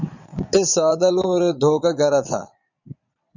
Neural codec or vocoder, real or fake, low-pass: vocoder, 22.05 kHz, 80 mel bands, Vocos; fake; 7.2 kHz